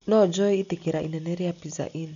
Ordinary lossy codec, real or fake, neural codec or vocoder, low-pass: none; real; none; 7.2 kHz